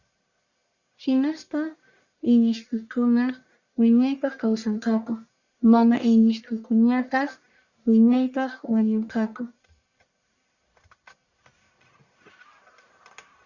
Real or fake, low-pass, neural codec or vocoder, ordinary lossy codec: fake; 7.2 kHz; codec, 44.1 kHz, 1.7 kbps, Pupu-Codec; Opus, 64 kbps